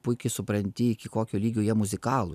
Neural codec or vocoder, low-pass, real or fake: none; 14.4 kHz; real